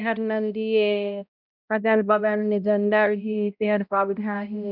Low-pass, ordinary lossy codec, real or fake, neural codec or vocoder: 5.4 kHz; none; fake; codec, 16 kHz, 0.5 kbps, X-Codec, HuBERT features, trained on balanced general audio